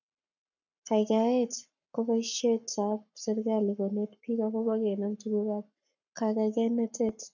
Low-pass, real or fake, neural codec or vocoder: 7.2 kHz; fake; codec, 44.1 kHz, 7.8 kbps, Pupu-Codec